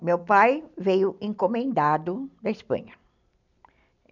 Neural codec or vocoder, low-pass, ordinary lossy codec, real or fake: none; 7.2 kHz; none; real